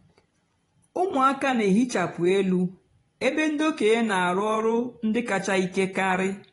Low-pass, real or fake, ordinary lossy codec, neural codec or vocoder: 10.8 kHz; fake; AAC, 32 kbps; vocoder, 24 kHz, 100 mel bands, Vocos